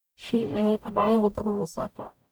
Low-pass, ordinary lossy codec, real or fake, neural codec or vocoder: none; none; fake; codec, 44.1 kHz, 0.9 kbps, DAC